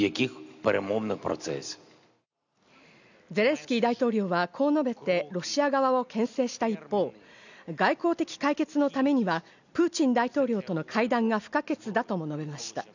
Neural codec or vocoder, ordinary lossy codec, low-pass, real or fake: none; none; 7.2 kHz; real